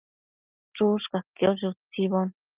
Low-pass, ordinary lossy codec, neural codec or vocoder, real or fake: 3.6 kHz; Opus, 24 kbps; none; real